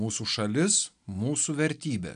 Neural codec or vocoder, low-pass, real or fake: none; 9.9 kHz; real